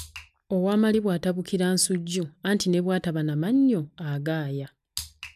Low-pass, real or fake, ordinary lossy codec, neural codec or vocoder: 14.4 kHz; real; none; none